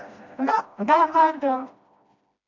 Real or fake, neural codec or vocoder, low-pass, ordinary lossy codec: fake; codec, 16 kHz, 1 kbps, FreqCodec, smaller model; 7.2 kHz; MP3, 48 kbps